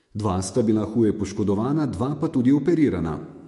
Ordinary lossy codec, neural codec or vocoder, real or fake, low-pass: MP3, 48 kbps; autoencoder, 48 kHz, 128 numbers a frame, DAC-VAE, trained on Japanese speech; fake; 14.4 kHz